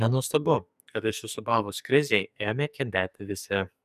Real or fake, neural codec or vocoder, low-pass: fake; codec, 44.1 kHz, 2.6 kbps, SNAC; 14.4 kHz